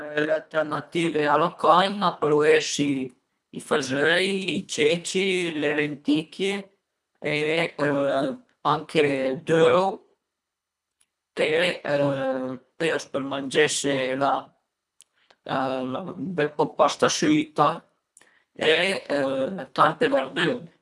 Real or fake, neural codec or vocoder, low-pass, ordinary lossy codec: fake; codec, 24 kHz, 1.5 kbps, HILCodec; none; none